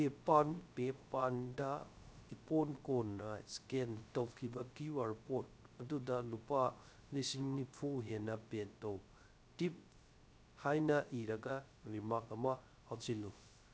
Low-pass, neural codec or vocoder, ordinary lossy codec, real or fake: none; codec, 16 kHz, 0.3 kbps, FocalCodec; none; fake